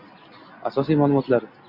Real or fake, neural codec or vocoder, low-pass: real; none; 5.4 kHz